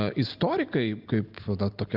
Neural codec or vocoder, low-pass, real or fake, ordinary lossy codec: none; 5.4 kHz; real; Opus, 24 kbps